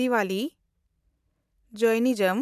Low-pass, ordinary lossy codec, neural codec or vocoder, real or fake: 14.4 kHz; none; vocoder, 44.1 kHz, 128 mel bands every 512 samples, BigVGAN v2; fake